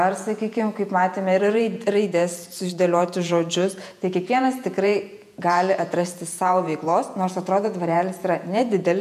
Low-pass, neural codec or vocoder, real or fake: 14.4 kHz; vocoder, 48 kHz, 128 mel bands, Vocos; fake